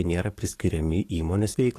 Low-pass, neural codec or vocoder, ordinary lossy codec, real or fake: 14.4 kHz; codec, 44.1 kHz, 7.8 kbps, DAC; AAC, 48 kbps; fake